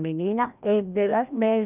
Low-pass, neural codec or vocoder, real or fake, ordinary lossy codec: 3.6 kHz; codec, 16 kHz, 1 kbps, FreqCodec, larger model; fake; none